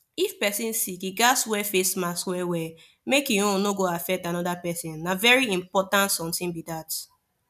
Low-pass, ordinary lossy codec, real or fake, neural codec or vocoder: 14.4 kHz; none; real; none